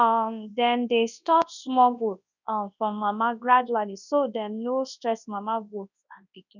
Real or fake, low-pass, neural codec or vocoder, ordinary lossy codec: fake; 7.2 kHz; codec, 24 kHz, 0.9 kbps, WavTokenizer, large speech release; none